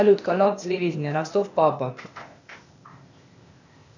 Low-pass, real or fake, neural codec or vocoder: 7.2 kHz; fake; codec, 16 kHz, 0.8 kbps, ZipCodec